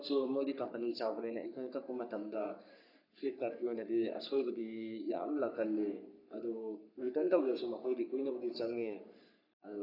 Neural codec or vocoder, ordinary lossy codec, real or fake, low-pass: codec, 44.1 kHz, 3.4 kbps, Pupu-Codec; none; fake; 5.4 kHz